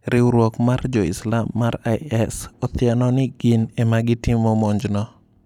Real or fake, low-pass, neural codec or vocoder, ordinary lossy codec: real; 19.8 kHz; none; none